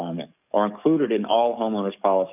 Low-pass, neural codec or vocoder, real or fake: 3.6 kHz; codec, 44.1 kHz, 7.8 kbps, DAC; fake